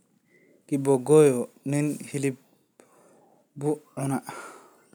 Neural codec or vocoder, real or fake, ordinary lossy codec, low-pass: none; real; none; none